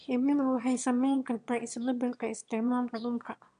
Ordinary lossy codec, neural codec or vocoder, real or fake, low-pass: none; autoencoder, 22.05 kHz, a latent of 192 numbers a frame, VITS, trained on one speaker; fake; 9.9 kHz